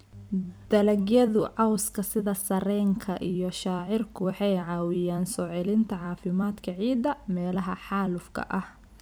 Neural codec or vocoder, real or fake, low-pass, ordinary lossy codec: vocoder, 44.1 kHz, 128 mel bands every 256 samples, BigVGAN v2; fake; none; none